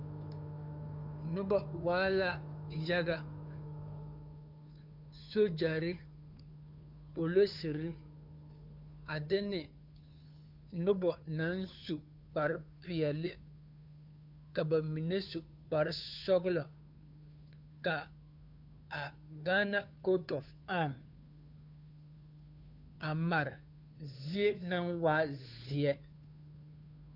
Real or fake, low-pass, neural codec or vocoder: fake; 5.4 kHz; codec, 16 kHz, 2 kbps, FunCodec, trained on Chinese and English, 25 frames a second